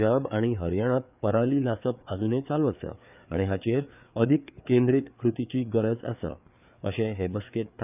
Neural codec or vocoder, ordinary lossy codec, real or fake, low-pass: codec, 16 kHz, 4 kbps, FreqCodec, larger model; none; fake; 3.6 kHz